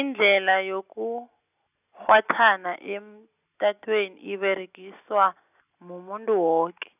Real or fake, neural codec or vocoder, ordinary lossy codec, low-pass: real; none; none; 3.6 kHz